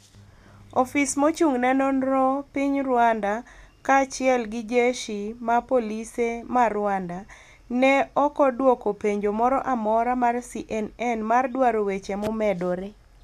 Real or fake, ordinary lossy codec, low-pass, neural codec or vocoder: real; none; 14.4 kHz; none